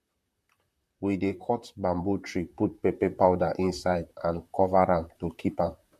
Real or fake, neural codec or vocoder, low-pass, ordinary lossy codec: fake; vocoder, 44.1 kHz, 128 mel bands, Pupu-Vocoder; 14.4 kHz; MP3, 64 kbps